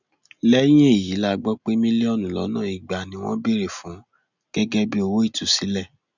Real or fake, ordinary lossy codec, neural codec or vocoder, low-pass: real; none; none; 7.2 kHz